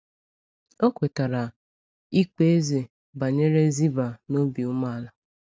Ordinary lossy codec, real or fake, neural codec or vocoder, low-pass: none; real; none; none